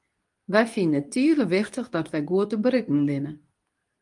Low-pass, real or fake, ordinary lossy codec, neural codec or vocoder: 10.8 kHz; fake; Opus, 32 kbps; codec, 24 kHz, 0.9 kbps, WavTokenizer, medium speech release version 2